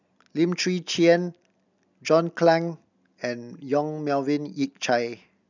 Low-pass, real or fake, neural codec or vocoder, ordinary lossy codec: 7.2 kHz; real; none; none